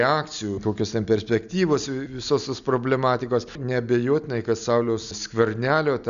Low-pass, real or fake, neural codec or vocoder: 7.2 kHz; real; none